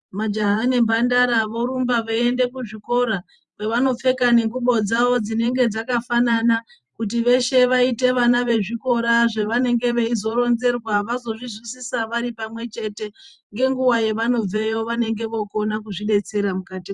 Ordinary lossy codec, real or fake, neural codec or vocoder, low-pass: Opus, 64 kbps; fake; vocoder, 44.1 kHz, 128 mel bands every 512 samples, BigVGAN v2; 10.8 kHz